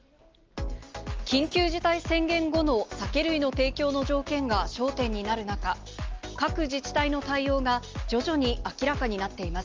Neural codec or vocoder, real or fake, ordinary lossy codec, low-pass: none; real; Opus, 24 kbps; 7.2 kHz